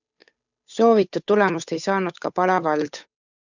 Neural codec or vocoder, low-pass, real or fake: codec, 16 kHz, 8 kbps, FunCodec, trained on Chinese and English, 25 frames a second; 7.2 kHz; fake